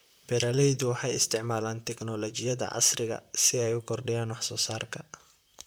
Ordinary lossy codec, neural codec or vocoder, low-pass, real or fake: none; vocoder, 44.1 kHz, 128 mel bands, Pupu-Vocoder; none; fake